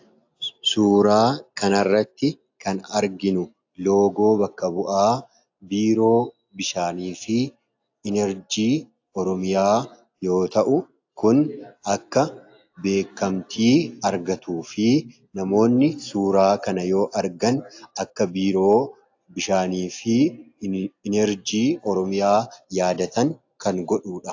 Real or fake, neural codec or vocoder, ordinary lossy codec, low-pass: real; none; AAC, 48 kbps; 7.2 kHz